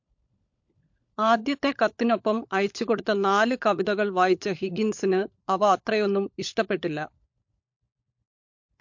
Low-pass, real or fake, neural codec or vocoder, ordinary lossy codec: 7.2 kHz; fake; codec, 16 kHz, 16 kbps, FunCodec, trained on LibriTTS, 50 frames a second; MP3, 48 kbps